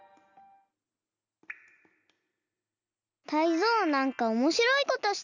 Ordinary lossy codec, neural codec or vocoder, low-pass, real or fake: none; none; 7.2 kHz; real